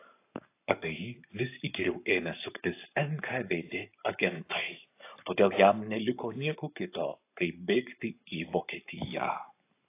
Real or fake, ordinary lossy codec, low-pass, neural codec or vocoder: fake; AAC, 24 kbps; 3.6 kHz; codec, 44.1 kHz, 7.8 kbps, Pupu-Codec